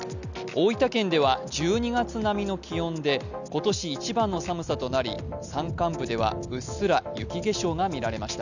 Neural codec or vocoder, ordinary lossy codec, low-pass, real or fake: none; none; 7.2 kHz; real